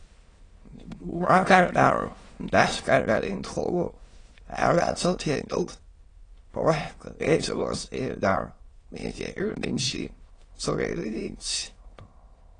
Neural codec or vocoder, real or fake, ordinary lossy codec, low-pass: autoencoder, 22.05 kHz, a latent of 192 numbers a frame, VITS, trained on many speakers; fake; AAC, 32 kbps; 9.9 kHz